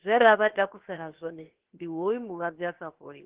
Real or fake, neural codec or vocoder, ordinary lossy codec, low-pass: fake; codec, 16 kHz, 0.7 kbps, FocalCodec; Opus, 16 kbps; 3.6 kHz